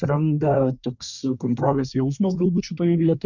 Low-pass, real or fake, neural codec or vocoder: 7.2 kHz; fake; codec, 24 kHz, 1 kbps, SNAC